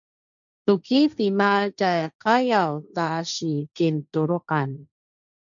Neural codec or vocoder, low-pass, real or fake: codec, 16 kHz, 1.1 kbps, Voila-Tokenizer; 7.2 kHz; fake